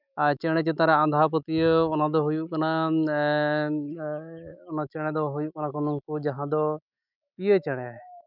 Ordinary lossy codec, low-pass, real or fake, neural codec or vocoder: none; 5.4 kHz; fake; autoencoder, 48 kHz, 128 numbers a frame, DAC-VAE, trained on Japanese speech